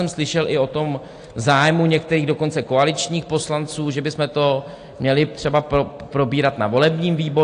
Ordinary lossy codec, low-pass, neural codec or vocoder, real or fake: AAC, 48 kbps; 9.9 kHz; none; real